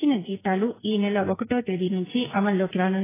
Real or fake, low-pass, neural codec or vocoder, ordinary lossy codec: fake; 3.6 kHz; codec, 44.1 kHz, 2.6 kbps, SNAC; AAC, 16 kbps